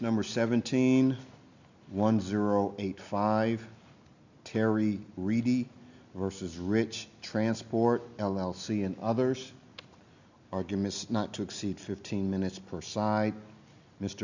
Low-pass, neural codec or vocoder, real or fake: 7.2 kHz; none; real